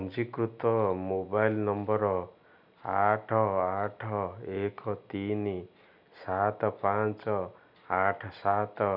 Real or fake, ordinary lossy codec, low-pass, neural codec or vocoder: real; none; 5.4 kHz; none